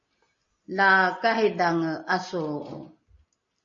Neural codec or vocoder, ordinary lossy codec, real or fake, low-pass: none; MP3, 32 kbps; real; 7.2 kHz